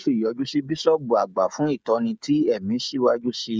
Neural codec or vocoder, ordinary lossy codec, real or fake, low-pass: codec, 16 kHz, 16 kbps, FreqCodec, smaller model; none; fake; none